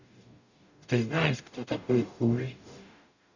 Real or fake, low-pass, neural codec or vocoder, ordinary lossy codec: fake; 7.2 kHz; codec, 44.1 kHz, 0.9 kbps, DAC; none